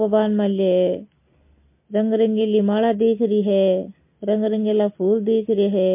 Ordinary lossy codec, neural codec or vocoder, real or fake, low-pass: MP3, 24 kbps; none; real; 3.6 kHz